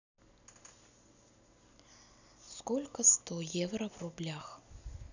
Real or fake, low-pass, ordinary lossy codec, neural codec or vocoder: real; 7.2 kHz; none; none